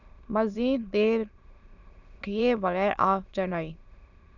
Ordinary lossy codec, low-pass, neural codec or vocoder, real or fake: Opus, 64 kbps; 7.2 kHz; autoencoder, 22.05 kHz, a latent of 192 numbers a frame, VITS, trained on many speakers; fake